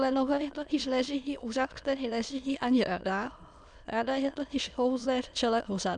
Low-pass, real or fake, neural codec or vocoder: 9.9 kHz; fake; autoencoder, 22.05 kHz, a latent of 192 numbers a frame, VITS, trained on many speakers